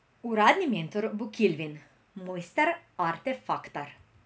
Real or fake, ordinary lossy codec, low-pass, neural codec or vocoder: real; none; none; none